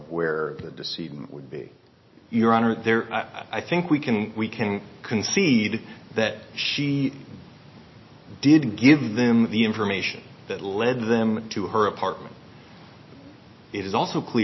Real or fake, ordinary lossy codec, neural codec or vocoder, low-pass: real; MP3, 24 kbps; none; 7.2 kHz